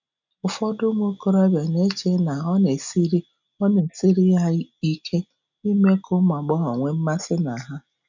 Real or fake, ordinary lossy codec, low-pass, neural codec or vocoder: real; none; 7.2 kHz; none